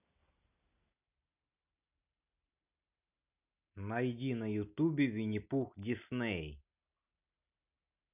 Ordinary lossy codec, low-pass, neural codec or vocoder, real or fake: none; 3.6 kHz; none; real